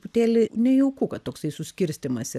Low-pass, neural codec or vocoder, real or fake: 14.4 kHz; none; real